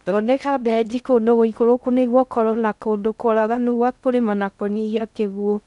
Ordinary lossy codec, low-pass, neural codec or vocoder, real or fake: none; 10.8 kHz; codec, 16 kHz in and 24 kHz out, 0.6 kbps, FocalCodec, streaming, 2048 codes; fake